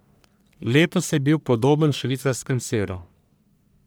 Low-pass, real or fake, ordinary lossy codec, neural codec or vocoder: none; fake; none; codec, 44.1 kHz, 1.7 kbps, Pupu-Codec